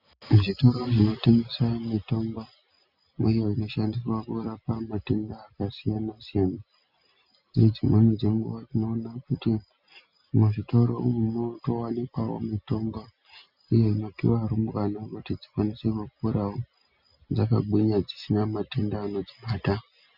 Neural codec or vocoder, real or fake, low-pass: none; real; 5.4 kHz